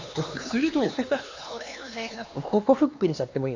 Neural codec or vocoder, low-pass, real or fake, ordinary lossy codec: codec, 16 kHz, 2 kbps, X-Codec, HuBERT features, trained on LibriSpeech; 7.2 kHz; fake; AAC, 48 kbps